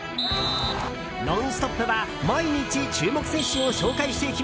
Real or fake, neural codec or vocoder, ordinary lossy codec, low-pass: real; none; none; none